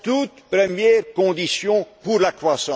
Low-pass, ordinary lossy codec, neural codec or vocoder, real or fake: none; none; none; real